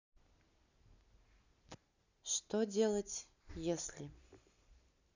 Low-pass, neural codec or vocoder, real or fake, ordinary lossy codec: 7.2 kHz; none; real; none